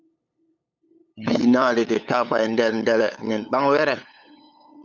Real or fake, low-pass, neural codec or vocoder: fake; 7.2 kHz; codec, 16 kHz, 8 kbps, FunCodec, trained on LibriTTS, 25 frames a second